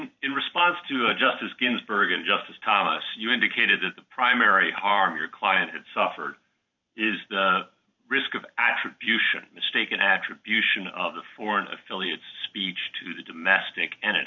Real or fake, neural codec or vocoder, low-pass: fake; vocoder, 44.1 kHz, 128 mel bands every 512 samples, BigVGAN v2; 7.2 kHz